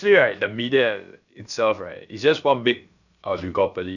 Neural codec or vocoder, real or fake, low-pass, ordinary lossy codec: codec, 16 kHz, about 1 kbps, DyCAST, with the encoder's durations; fake; 7.2 kHz; none